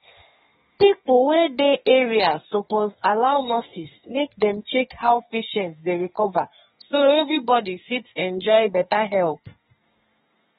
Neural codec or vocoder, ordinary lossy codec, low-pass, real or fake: codec, 32 kHz, 1.9 kbps, SNAC; AAC, 16 kbps; 14.4 kHz; fake